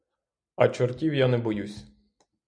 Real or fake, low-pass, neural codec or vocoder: real; 9.9 kHz; none